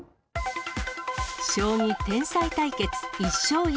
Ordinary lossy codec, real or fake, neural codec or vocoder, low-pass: none; real; none; none